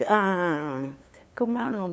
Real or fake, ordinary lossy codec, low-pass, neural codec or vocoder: fake; none; none; codec, 16 kHz, 2 kbps, FunCodec, trained on LibriTTS, 25 frames a second